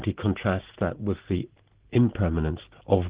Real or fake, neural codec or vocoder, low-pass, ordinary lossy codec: real; none; 3.6 kHz; Opus, 16 kbps